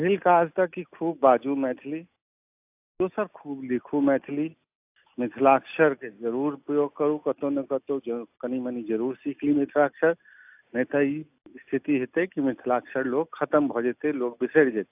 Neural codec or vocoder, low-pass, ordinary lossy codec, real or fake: none; 3.6 kHz; AAC, 32 kbps; real